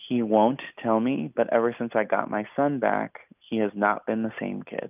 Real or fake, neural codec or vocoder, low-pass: real; none; 3.6 kHz